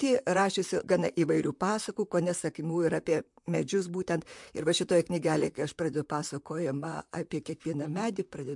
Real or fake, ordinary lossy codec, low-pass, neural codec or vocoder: fake; MP3, 64 kbps; 10.8 kHz; vocoder, 44.1 kHz, 128 mel bands, Pupu-Vocoder